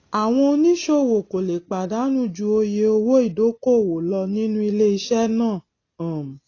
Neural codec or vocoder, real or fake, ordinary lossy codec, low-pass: none; real; AAC, 32 kbps; 7.2 kHz